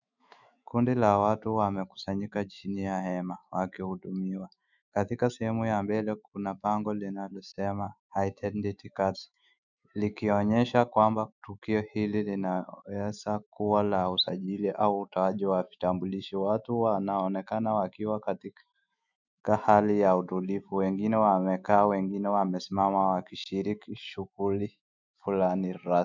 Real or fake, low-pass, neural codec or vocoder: fake; 7.2 kHz; autoencoder, 48 kHz, 128 numbers a frame, DAC-VAE, trained on Japanese speech